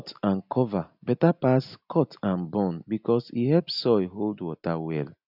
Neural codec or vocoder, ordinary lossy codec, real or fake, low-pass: none; none; real; 5.4 kHz